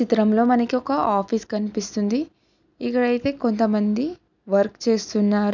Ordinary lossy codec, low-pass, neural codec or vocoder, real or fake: none; 7.2 kHz; none; real